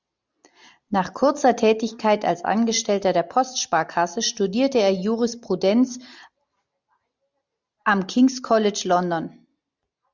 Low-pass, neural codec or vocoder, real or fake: 7.2 kHz; none; real